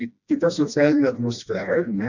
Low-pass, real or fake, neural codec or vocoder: 7.2 kHz; fake; codec, 16 kHz, 1 kbps, FreqCodec, smaller model